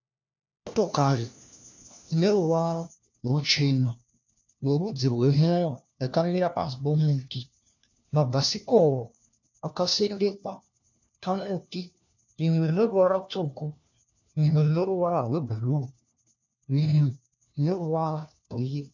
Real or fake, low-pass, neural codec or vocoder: fake; 7.2 kHz; codec, 16 kHz, 1 kbps, FunCodec, trained on LibriTTS, 50 frames a second